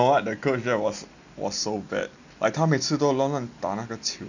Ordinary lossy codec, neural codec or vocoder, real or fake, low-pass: none; none; real; 7.2 kHz